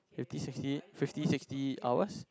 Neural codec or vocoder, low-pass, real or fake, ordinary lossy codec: none; none; real; none